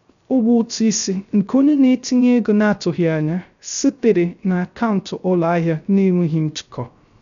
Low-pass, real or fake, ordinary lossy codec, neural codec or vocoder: 7.2 kHz; fake; none; codec, 16 kHz, 0.3 kbps, FocalCodec